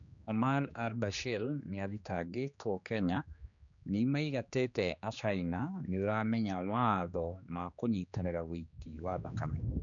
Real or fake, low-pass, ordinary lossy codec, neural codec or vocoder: fake; 7.2 kHz; none; codec, 16 kHz, 2 kbps, X-Codec, HuBERT features, trained on general audio